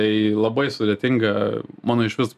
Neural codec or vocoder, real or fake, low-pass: none; real; 14.4 kHz